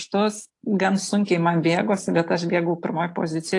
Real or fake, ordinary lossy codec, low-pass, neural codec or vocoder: real; AAC, 48 kbps; 10.8 kHz; none